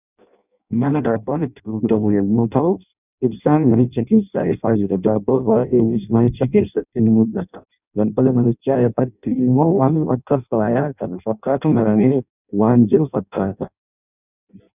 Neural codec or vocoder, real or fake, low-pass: codec, 16 kHz in and 24 kHz out, 0.6 kbps, FireRedTTS-2 codec; fake; 3.6 kHz